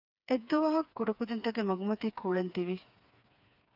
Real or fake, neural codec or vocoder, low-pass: fake; codec, 16 kHz, 4 kbps, FreqCodec, smaller model; 5.4 kHz